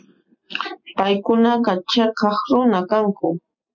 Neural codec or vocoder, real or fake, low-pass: none; real; 7.2 kHz